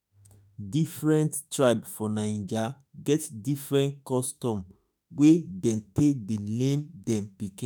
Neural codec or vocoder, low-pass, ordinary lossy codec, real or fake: autoencoder, 48 kHz, 32 numbers a frame, DAC-VAE, trained on Japanese speech; none; none; fake